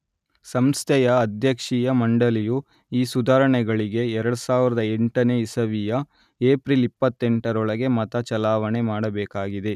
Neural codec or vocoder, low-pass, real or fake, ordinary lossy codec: none; 14.4 kHz; real; none